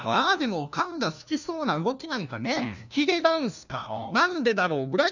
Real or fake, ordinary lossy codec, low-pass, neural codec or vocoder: fake; none; 7.2 kHz; codec, 16 kHz, 1 kbps, FunCodec, trained on LibriTTS, 50 frames a second